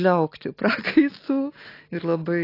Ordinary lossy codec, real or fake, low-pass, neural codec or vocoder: AAC, 24 kbps; real; 5.4 kHz; none